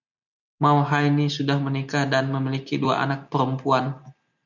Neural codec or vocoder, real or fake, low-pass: none; real; 7.2 kHz